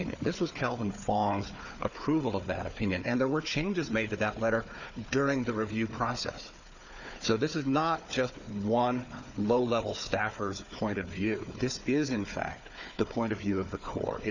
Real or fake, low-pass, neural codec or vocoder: fake; 7.2 kHz; codec, 16 kHz, 4 kbps, FunCodec, trained on Chinese and English, 50 frames a second